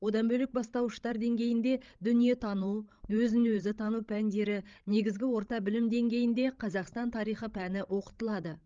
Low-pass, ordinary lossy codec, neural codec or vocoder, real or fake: 7.2 kHz; Opus, 32 kbps; codec, 16 kHz, 16 kbps, FreqCodec, larger model; fake